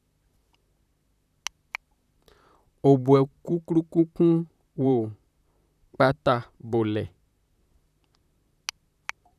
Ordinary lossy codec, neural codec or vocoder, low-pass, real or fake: none; vocoder, 44.1 kHz, 128 mel bands, Pupu-Vocoder; 14.4 kHz; fake